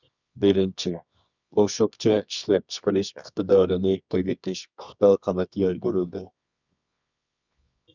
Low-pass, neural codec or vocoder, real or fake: 7.2 kHz; codec, 24 kHz, 0.9 kbps, WavTokenizer, medium music audio release; fake